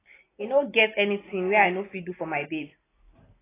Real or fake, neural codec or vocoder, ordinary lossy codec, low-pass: real; none; AAC, 16 kbps; 3.6 kHz